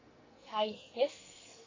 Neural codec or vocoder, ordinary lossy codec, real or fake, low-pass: codec, 44.1 kHz, 2.6 kbps, SNAC; AAC, 32 kbps; fake; 7.2 kHz